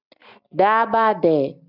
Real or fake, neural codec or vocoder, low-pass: real; none; 5.4 kHz